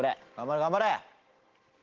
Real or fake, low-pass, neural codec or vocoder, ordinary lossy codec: real; 7.2 kHz; none; Opus, 16 kbps